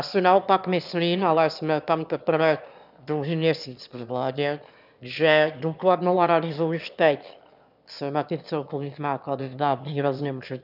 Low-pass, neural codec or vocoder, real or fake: 5.4 kHz; autoencoder, 22.05 kHz, a latent of 192 numbers a frame, VITS, trained on one speaker; fake